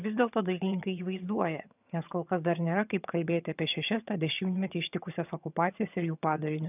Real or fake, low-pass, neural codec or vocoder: fake; 3.6 kHz; vocoder, 22.05 kHz, 80 mel bands, HiFi-GAN